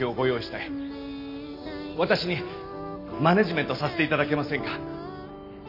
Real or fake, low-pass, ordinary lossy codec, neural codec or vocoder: real; 5.4 kHz; none; none